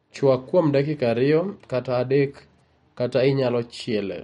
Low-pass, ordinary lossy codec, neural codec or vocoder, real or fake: 19.8 kHz; MP3, 48 kbps; none; real